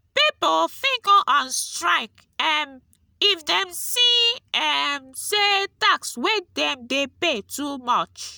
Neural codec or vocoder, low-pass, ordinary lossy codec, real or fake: none; none; none; real